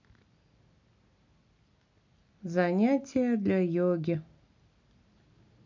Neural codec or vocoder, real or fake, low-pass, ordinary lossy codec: none; real; 7.2 kHz; MP3, 48 kbps